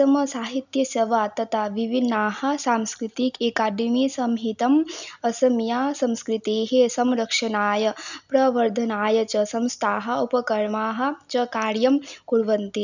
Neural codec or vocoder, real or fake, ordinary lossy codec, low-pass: none; real; none; 7.2 kHz